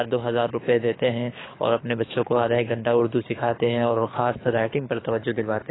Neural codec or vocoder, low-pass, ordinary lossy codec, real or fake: codec, 24 kHz, 6 kbps, HILCodec; 7.2 kHz; AAC, 16 kbps; fake